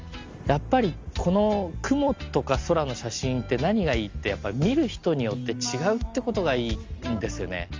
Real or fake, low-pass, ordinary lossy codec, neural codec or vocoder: real; 7.2 kHz; Opus, 32 kbps; none